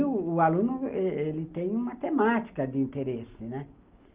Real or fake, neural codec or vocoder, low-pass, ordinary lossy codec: real; none; 3.6 kHz; Opus, 32 kbps